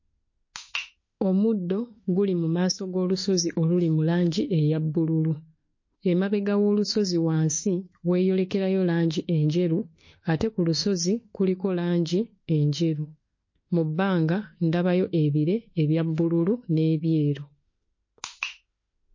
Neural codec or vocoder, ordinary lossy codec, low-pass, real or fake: autoencoder, 48 kHz, 32 numbers a frame, DAC-VAE, trained on Japanese speech; MP3, 32 kbps; 7.2 kHz; fake